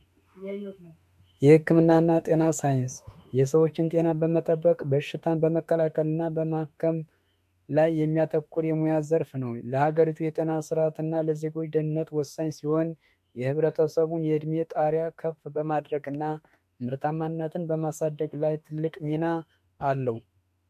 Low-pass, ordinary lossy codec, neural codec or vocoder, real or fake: 14.4 kHz; MP3, 64 kbps; autoencoder, 48 kHz, 32 numbers a frame, DAC-VAE, trained on Japanese speech; fake